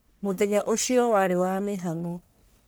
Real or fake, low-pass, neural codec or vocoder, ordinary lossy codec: fake; none; codec, 44.1 kHz, 1.7 kbps, Pupu-Codec; none